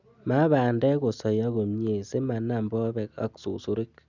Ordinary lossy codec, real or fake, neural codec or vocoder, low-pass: none; real; none; 7.2 kHz